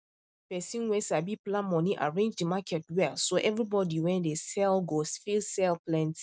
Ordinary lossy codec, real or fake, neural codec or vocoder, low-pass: none; real; none; none